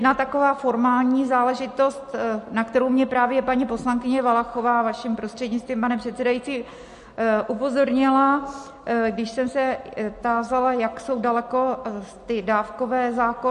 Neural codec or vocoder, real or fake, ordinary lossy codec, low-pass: none; real; MP3, 48 kbps; 14.4 kHz